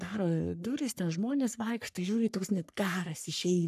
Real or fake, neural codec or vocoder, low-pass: fake; codec, 44.1 kHz, 3.4 kbps, Pupu-Codec; 14.4 kHz